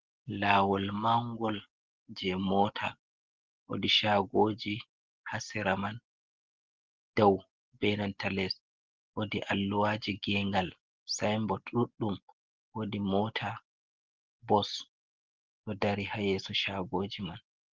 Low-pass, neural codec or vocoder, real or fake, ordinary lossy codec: 7.2 kHz; none; real; Opus, 16 kbps